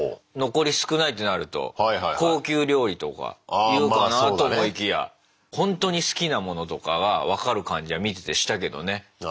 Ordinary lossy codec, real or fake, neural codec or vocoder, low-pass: none; real; none; none